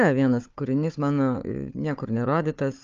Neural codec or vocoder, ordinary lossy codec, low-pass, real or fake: codec, 16 kHz, 4 kbps, FunCodec, trained on Chinese and English, 50 frames a second; Opus, 24 kbps; 7.2 kHz; fake